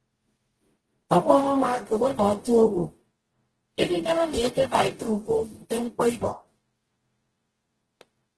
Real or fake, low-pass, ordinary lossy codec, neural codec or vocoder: fake; 10.8 kHz; Opus, 16 kbps; codec, 44.1 kHz, 0.9 kbps, DAC